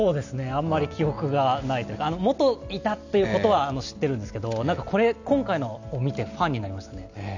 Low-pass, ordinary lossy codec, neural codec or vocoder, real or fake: 7.2 kHz; none; none; real